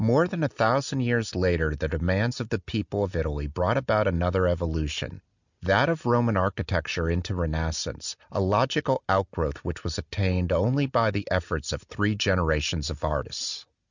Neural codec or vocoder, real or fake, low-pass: none; real; 7.2 kHz